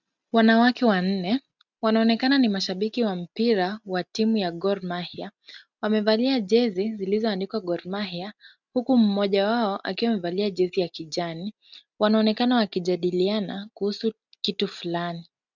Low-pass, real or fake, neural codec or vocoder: 7.2 kHz; real; none